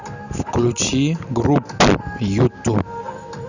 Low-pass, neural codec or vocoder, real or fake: 7.2 kHz; none; real